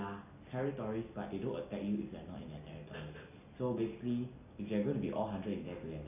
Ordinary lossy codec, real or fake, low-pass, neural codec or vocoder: none; real; 3.6 kHz; none